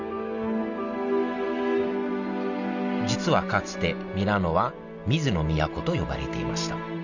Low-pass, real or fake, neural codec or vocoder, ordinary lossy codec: 7.2 kHz; real; none; none